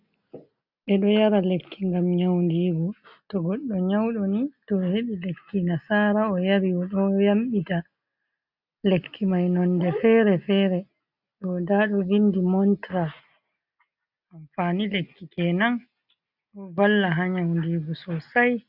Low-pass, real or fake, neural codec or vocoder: 5.4 kHz; real; none